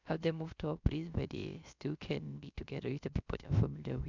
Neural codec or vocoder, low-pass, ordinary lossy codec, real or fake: codec, 16 kHz, about 1 kbps, DyCAST, with the encoder's durations; 7.2 kHz; none; fake